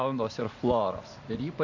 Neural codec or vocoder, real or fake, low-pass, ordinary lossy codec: none; real; 7.2 kHz; AAC, 48 kbps